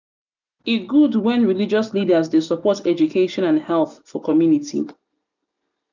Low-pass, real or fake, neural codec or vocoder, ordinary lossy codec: 7.2 kHz; real; none; none